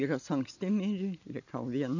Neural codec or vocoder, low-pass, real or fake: none; 7.2 kHz; real